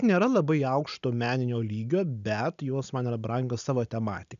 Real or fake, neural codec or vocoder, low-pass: real; none; 7.2 kHz